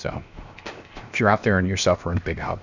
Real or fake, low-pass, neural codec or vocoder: fake; 7.2 kHz; codec, 16 kHz, 0.7 kbps, FocalCodec